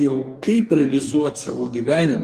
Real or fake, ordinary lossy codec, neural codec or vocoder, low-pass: fake; Opus, 32 kbps; codec, 44.1 kHz, 2.6 kbps, DAC; 14.4 kHz